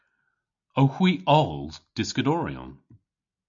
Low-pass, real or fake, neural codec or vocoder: 7.2 kHz; real; none